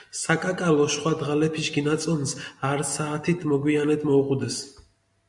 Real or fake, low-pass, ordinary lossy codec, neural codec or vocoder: real; 10.8 kHz; MP3, 64 kbps; none